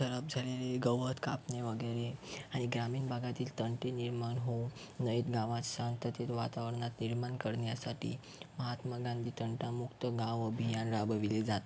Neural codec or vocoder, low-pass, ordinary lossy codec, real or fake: none; none; none; real